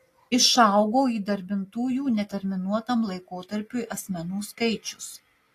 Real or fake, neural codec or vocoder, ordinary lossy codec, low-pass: real; none; AAC, 48 kbps; 14.4 kHz